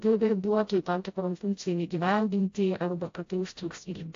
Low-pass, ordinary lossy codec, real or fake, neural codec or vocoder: 7.2 kHz; AAC, 48 kbps; fake; codec, 16 kHz, 0.5 kbps, FreqCodec, smaller model